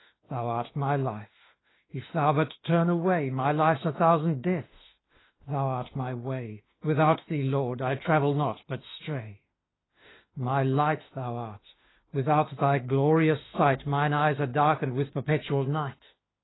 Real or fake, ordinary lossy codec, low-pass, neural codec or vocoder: fake; AAC, 16 kbps; 7.2 kHz; autoencoder, 48 kHz, 32 numbers a frame, DAC-VAE, trained on Japanese speech